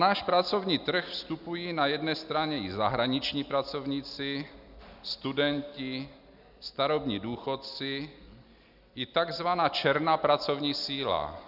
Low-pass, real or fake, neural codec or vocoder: 5.4 kHz; real; none